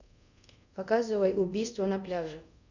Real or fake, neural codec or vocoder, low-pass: fake; codec, 24 kHz, 0.5 kbps, DualCodec; 7.2 kHz